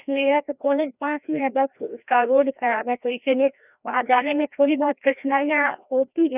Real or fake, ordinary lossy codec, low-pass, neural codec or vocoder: fake; none; 3.6 kHz; codec, 16 kHz, 1 kbps, FreqCodec, larger model